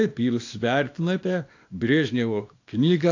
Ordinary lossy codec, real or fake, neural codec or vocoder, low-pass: AAC, 48 kbps; fake; codec, 24 kHz, 0.9 kbps, WavTokenizer, small release; 7.2 kHz